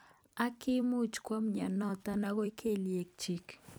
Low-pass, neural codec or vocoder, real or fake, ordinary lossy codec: none; vocoder, 44.1 kHz, 128 mel bands every 256 samples, BigVGAN v2; fake; none